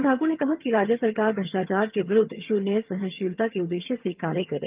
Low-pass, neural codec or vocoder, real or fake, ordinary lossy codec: 3.6 kHz; vocoder, 22.05 kHz, 80 mel bands, HiFi-GAN; fake; Opus, 24 kbps